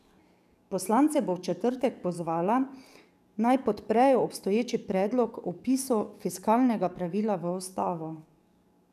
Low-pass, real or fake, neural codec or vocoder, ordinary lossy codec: 14.4 kHz; fake; codec, 44.1 kHz, 7.8 kbps, DAC; none